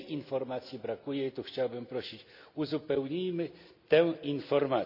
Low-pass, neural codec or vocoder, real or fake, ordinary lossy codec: 5.4 kHz; none; real; none